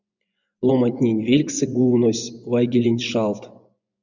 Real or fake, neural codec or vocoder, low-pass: fake; vocoder, 24 kHz, 100 mel bands, Vocos; 7.2 kHz